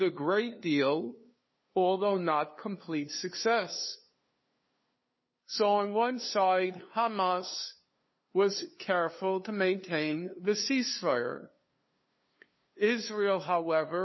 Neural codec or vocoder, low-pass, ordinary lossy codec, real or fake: codec, 16 kHz, 2 kbps, FunCodec, trained on LibriTTS, 25 frames a second; 7.2 kHz; MP3, 24 kbps; fake